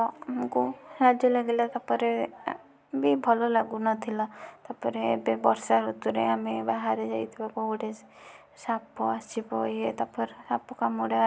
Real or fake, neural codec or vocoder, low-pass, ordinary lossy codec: real; none; none; none